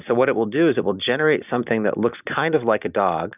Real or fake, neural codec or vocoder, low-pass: real; none; 3.6 kHz